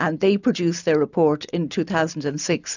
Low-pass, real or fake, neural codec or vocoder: 7.2 kHz; real; none